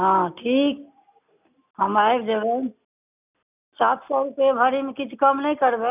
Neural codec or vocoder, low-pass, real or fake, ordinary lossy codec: none; 3.6 kHz; real; none